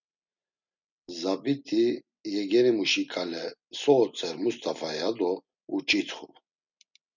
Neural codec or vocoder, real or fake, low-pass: none; real; 7.2 kHz